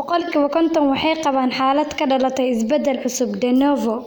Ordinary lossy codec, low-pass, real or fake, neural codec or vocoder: none; none; real; none